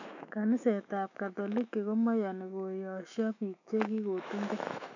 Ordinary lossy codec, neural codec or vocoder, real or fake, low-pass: none; autoencoder, 48 kHz, 128 numbers a frame, DAC-VAE, trained on Japanese speech; fake; 7.2 kHz